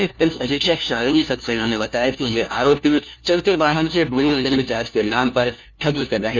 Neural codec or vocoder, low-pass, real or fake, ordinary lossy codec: codec, 16 kHz, 1 kbps, FunCodec, trained on LibriTTS, 50 frames a second; 7.2 kHz; fake; none